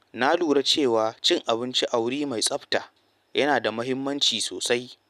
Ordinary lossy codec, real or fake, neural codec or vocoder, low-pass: none; real; none; 14.4 kHz